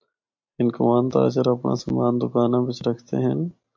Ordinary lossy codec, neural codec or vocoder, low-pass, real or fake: MP3, 48 kbps; none; 7.2 kHz; real